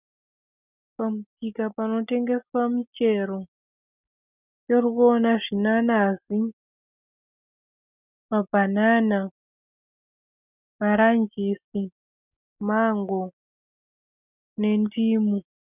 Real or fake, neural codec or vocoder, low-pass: real; none; 3.6 kHz